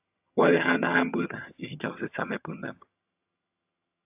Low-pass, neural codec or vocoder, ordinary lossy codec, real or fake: 3.6 kHz; vocoder, 22.05 kHz, 80 mel bands, HiFi-GAN; AAC, 32 kbps; fake